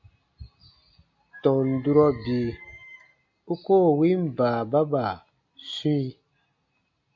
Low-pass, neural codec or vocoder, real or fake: 7.2 kHz; none; real